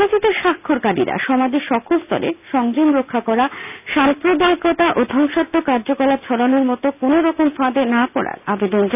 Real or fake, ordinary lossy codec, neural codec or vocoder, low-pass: real; none; none; 3.6 kHz